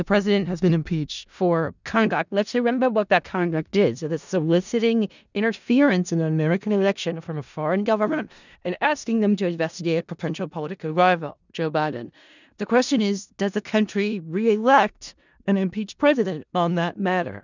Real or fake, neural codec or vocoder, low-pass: fake; codec, 16 kHz in and 24 kHz out, 0.4 kbps, LongCat-Audio-Codec, four codebook decoder; 7.2 kHz